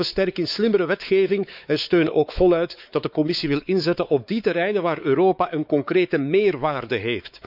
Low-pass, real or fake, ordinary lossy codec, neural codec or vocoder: 5.4 kHz; fake; none; codec, 16 kHz, 4 kbps, X-Codec, WavLM features, trained on Multilingual LibriSpeech